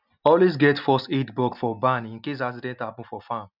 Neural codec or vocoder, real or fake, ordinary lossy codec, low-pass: none; real; none; 5.4 kHz